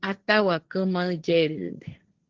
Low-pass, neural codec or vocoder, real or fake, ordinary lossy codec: 7.2 kHz; codec, 16 kHz, 1.1 kbps, Voila-Tokenizer; fake; Opus, 16 kbps